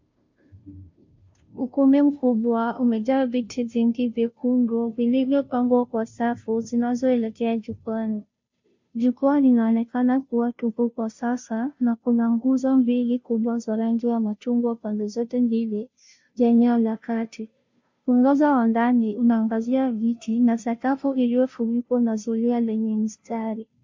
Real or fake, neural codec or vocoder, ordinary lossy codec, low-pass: fake; codec, 16 kHz, 0.5 kbps, FunCodec, trained on Chinese and English, 25 frames a second; MP3, 48 kbps; 7.2 kHz